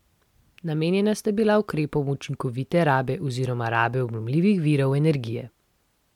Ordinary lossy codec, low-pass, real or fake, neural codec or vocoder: MP3, 96 kbps; 19.8 kHz; real; none